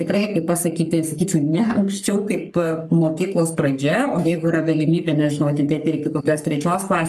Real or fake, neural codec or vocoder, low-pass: fake; codec, 44.1 kHz, 3.4 kbps, Pupu-Codec; 14.4 kHz